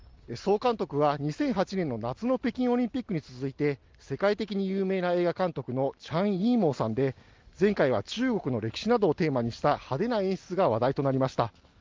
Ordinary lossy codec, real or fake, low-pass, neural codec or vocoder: Opus, 32 kbps; real; 7.2 kHz; none